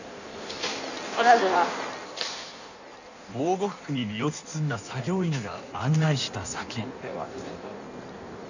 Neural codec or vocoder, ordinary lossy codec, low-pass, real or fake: codec, 16 kHz in and 24 kHz out, 1.1 kbps, FireRedTTS-2 codec; none; 7.2 kHz; fake